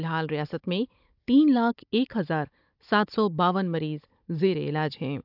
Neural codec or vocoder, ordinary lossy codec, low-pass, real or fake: codec, 16 kHz, 16 kbps, FunCodec, trained on Chinese and English, 50 frames a second; AAC, 48 kbps; 5.4 kHz; fake